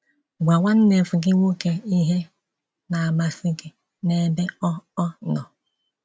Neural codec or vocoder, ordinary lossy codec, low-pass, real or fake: none; none; none; real